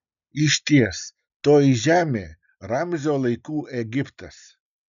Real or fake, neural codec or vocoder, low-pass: real; none; 7.2 kHz